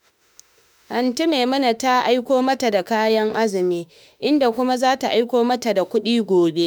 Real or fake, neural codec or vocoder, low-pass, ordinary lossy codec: fake; autoencoder, 48 kHz, 32 numbers a frame, DAC-VAE, trained on Japanese speech; none; none